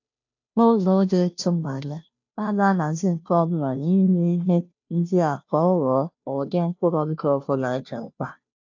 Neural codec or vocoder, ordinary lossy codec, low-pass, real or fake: codec, 16 kHz, 0.5 kbps, FunCodec, trained on Chinese and English, 25 frames a second; AAC, 48 kbps; 7.2 kHz; fake